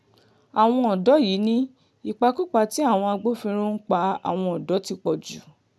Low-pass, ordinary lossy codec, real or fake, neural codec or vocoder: none; none; real; none